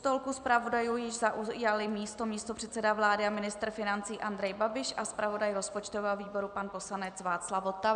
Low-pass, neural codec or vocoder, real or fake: 9.9 kHz; none; real